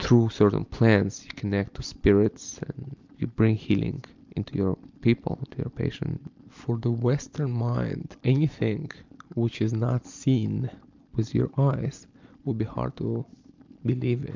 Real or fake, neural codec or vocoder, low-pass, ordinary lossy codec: real; none; 7.2 kHz; MP3, 64 kbps